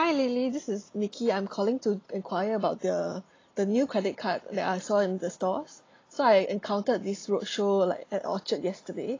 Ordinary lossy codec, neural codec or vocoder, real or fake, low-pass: AAC, 32 kbps; none; real; 7.2 kHz